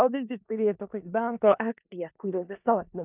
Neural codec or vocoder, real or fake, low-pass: codec, 16 kHz in and 24 kHz out, 0.4 kbps, LongCat-Audio-Codec, four codebook decoder; fake; 3.6 kHz